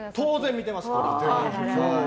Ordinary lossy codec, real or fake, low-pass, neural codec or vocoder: none; real; none; none